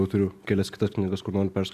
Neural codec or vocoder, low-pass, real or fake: none; 14.4 kHz; real